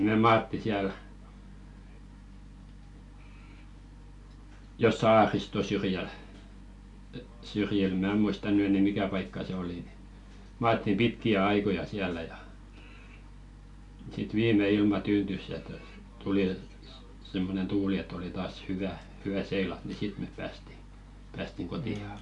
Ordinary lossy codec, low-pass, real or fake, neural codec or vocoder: AAC, 64 kbps; 10.8 kHz; real; none